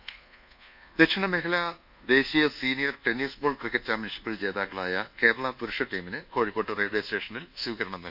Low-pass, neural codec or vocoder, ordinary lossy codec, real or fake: 5.4 kHz; codec, 24 kHz, 1.2 kbps, DualCodec; none; fake